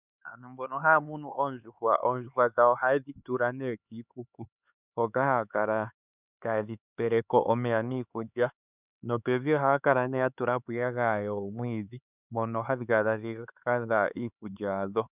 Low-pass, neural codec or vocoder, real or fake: 3.6 kHz; codec, 16 kHz, 4 kbps, X-Codec, HuBERT features, trained on LibriSpeech; fake